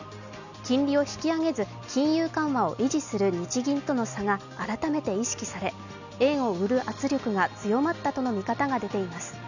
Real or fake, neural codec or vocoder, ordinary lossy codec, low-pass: real; none; none; 7.2 kHz